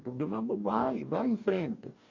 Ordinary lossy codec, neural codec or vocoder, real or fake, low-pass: MP3, 48 kbps; codec, 44.1 kHz, 2.6 kbps, DAC; fake; 7.2 kHz